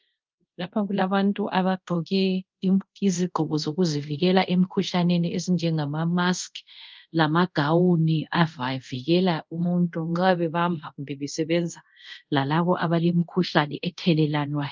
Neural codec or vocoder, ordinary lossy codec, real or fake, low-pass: codec, 24 kHz, 0.5 kbps, DualCodec; Opus, 24 kbps; fake; 7.2 kHz